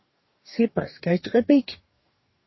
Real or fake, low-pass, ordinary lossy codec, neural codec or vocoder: fake; 7.2 kHz; MP3, 24 kbps; codec, 44.1 kHz, 2.6 kbps, DAC